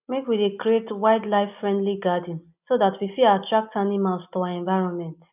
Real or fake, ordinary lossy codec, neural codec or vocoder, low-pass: real; none; none; 3.6 kHz